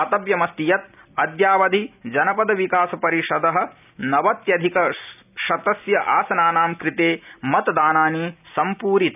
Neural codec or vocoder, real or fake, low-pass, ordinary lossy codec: none; real; 3.6 kHz; none